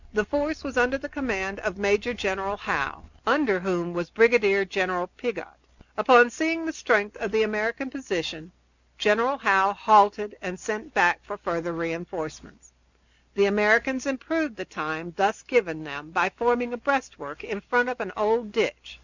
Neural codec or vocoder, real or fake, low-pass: none; real; 7.2 kHz